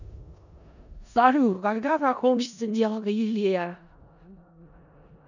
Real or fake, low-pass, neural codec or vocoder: fake; 7.2 kHz; codec, 16 kHz in and 24 kHz out, 0.4 kbps, LongCat-Audio-Codec, four codebook decoder